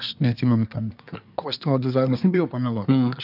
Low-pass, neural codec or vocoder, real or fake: 5.4 kHz; codec, 24 kHz, 1 kbps, SNAC; fake